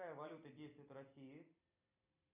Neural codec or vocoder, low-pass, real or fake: vocoder, 44.1 kHz, 128 mel bands every 256 samples, BigVGAN v2; 3.6 kHz; fake